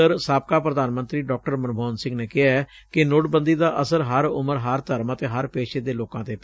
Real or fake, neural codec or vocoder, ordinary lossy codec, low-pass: real; none; none; none